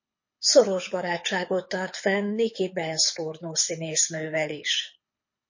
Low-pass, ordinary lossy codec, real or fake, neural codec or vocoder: 7.2 kHz; MP3, 32 kbps; fake; codec, 24 kHz, 6 kbps, HILCodec